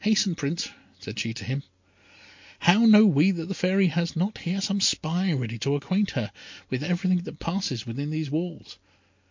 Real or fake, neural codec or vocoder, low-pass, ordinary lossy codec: real; none; 7.2 kHz; MP3, 48 kbps